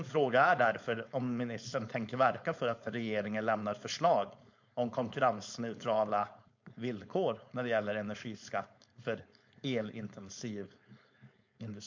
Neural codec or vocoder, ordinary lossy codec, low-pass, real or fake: codec, 16 kHz, 4.8 kbps, FACodec; MP3, 48 kbps; 7.2 kHz; fake